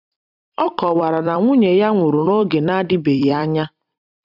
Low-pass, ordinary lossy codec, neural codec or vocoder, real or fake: 5.4 kHz; none; vocoder, 44.1 kHz, 128 mel bands every 256 samples, BigVGAN v2; fake